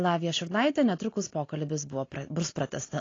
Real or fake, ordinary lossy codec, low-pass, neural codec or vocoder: real; AAC, 32 kbps; 7.2 kHz; none